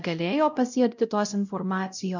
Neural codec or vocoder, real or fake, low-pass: codec, 16 kHz, 0.5 kbps, X-Codec, WavLM features, trained on Multilingual LibriSpeech; fake; 7.2 kHz